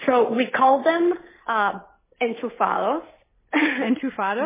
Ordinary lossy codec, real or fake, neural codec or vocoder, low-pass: MP3, 16 kbps; real; none; 3.6 kHz